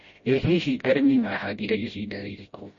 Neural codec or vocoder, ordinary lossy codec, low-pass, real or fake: codec, 16 kHz, 0.5 kbps, FreqCodec, smaller model; MP3, 32 kbps; 7.2 kHz; fake